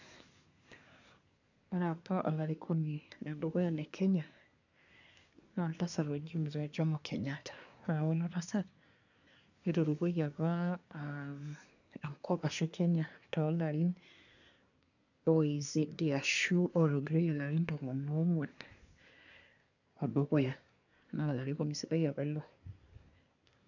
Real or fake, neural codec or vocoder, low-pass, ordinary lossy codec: fake; codec, 24 kHz, 1 kbps, SNAC; 7.2 kHz; none